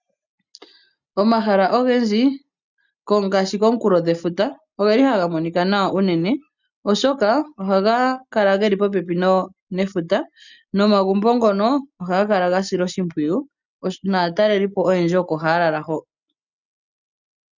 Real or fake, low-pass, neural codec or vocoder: real; 7.2 kHz; none